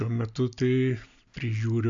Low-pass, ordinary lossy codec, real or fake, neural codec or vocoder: 7.2 kHz; AAC, 64 kbps; real; none